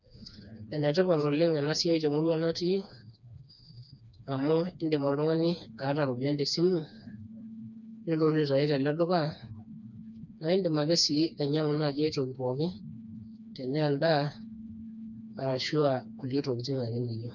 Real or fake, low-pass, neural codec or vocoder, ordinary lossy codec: fake; 7.2 kHz; codec, 16 kHz, 2 kbps, FreqCodec, smaller model; none